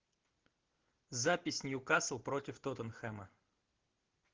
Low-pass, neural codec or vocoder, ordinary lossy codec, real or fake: 7.2 kHz; none; Opus, 16 kbps; real